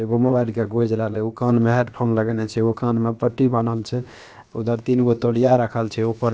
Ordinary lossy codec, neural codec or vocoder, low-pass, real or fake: none; codec, 16 kHz, about 1 kbps, DyCAST, with the encoder's durations; none; fake